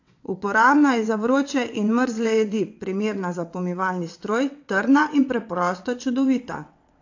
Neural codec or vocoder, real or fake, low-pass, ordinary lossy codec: vocoder, 22.05 kHz, 80 mel bands, WaveNeXt; fake; 7.2 kHz; AAC, 48 kbps